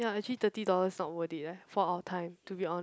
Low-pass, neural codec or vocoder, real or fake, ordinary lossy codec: none; none; real; none